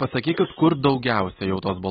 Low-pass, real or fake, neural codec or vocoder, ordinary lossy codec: 9.9 kHz; real; none; AAC, 16 kbps